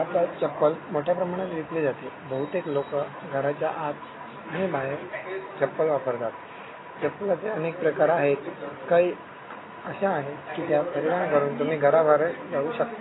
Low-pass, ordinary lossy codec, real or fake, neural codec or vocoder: 7.2 kHz; AAC, 16 kbps; real; none